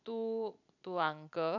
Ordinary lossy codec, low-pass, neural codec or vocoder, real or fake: none; 7.2 kHz; vocoder, 44.1 kHz, 128 mel bands every 256 samples, BigVGAN v2; fake